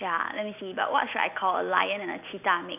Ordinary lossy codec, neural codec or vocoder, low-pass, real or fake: none; none; 3.6 kHz; real